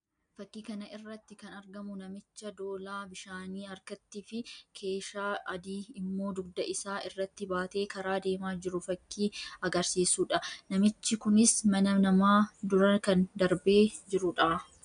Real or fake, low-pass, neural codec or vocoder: real; 9.9 kHz; none